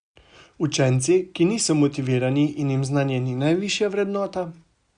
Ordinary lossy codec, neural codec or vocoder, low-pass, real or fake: Opus, 64 kbps; none; 9.9 kHz; real